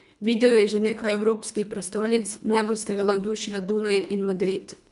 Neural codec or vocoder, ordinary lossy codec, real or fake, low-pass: codec, 24 kHz, 1.5 kbps, HILCodec; none; fake; 10.8 kHz